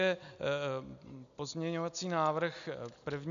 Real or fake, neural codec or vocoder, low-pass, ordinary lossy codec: real; none; 7.2 kHz; MP3, 64 kbps